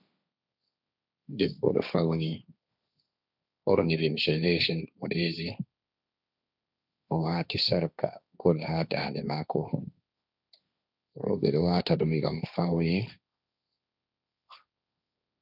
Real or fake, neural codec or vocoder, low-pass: fake; codec, 16 kHz, 1.1 kbps, Voila-Tokenizer; 5.4 kHz